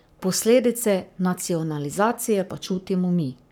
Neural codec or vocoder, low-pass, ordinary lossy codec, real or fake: codec, 44.1 kHz, 7.8 kbps, Pupu-Codec; none; none; fake